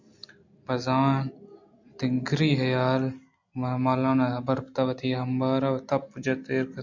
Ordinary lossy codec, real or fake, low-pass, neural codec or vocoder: MP3, 64 kbps; real; 7.2 kHz; none